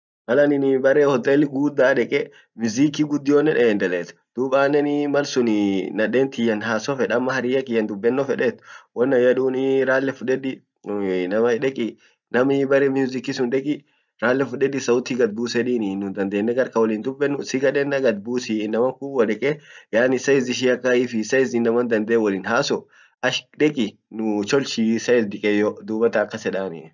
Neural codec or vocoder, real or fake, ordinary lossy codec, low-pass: none; real; none; 7.2 kHz